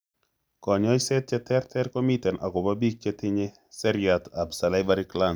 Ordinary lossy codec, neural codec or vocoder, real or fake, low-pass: none; none; real; none